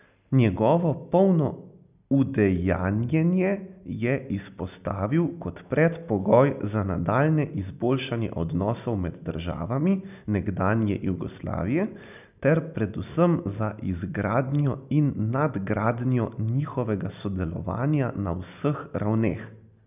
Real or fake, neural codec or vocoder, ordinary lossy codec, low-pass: real; none; none; 3.6 kHz